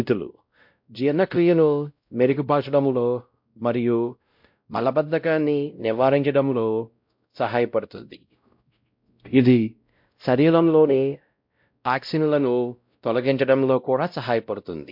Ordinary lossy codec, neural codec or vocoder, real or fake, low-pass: MP3, 48 kbps; codec, 16 kHz, 0.5 kbps, X-Codec, WavLM features, trained on Multilingual LibriSpeech; fake; 5.4 kHz